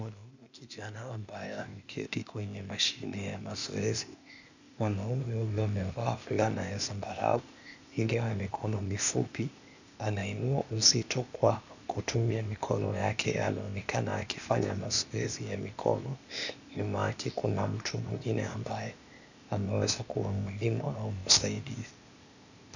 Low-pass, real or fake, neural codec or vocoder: 7.2 kHz; fake; codec, 16 kHz, 0.8 kbps, ZipCodec